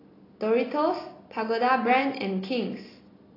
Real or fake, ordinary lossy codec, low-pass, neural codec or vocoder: real; AAC, 24 kbps; 5.4 kHz; none